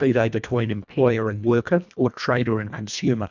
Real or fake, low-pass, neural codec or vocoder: fake; 7.2 kHz; codec, 24 kHz, 1.5 kbps, HILCodec